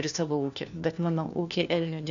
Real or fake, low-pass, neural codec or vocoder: fake; 7.2 kHz; codec, 16 kHz, 1 kbps, FunCodec, trained on LibriTTS, 50 frames a second